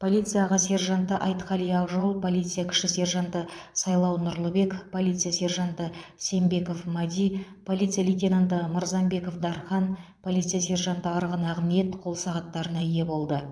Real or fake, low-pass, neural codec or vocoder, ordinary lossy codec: fake; none; vocoder, 22.05 kHz, 80 mel bands, Vocos; none